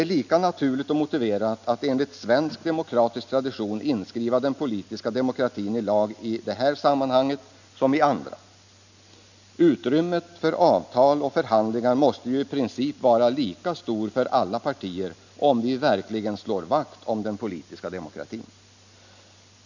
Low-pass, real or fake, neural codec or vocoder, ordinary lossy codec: 7.2 kHz; real; none; none